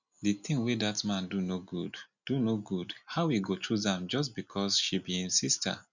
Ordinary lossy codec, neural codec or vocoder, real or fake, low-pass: none; none; real; 7.2 kHz